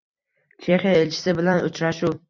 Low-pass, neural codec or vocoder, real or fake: 7.2 kHz; vocoder, 44.1 kHz, 128 mel bands every 256 samples, BigVGAN v2; fake